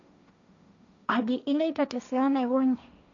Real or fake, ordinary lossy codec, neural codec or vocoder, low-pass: fake; none; codec, 16 kHz, 1.1 kbps, Voila-Tokenizer; 7.2 kHz